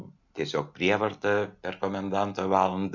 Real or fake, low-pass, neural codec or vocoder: real; 7.2 kHz; none